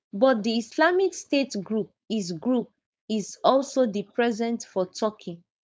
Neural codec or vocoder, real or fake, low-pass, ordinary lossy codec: codec, 16 kHz, 4.8 kbps, FACodec; fake; none; none